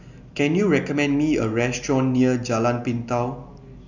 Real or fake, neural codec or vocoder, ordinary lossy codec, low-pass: real; none; none; 7.2 kHz